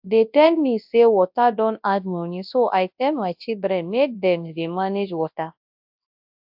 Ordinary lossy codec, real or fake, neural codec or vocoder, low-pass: AAC, 48 kbps; fake; codec, 24 kHz, 0.9 kbps, WavTokenizer, large speech release; 5.4 kHz